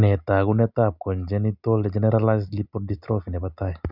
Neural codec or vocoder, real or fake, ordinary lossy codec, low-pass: none; real; none; 5.4 kHz